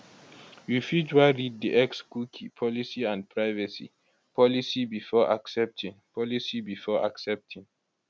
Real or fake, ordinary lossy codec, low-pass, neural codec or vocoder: real; none; none; none